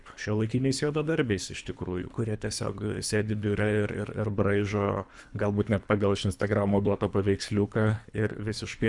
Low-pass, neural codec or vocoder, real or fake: 10.8 kHz; codec, 24 kHz, 3 kbps, HILCodec; fake